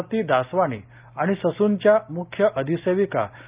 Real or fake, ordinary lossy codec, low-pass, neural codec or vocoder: real; Opus, 32 kbps; 3.6 kHz; none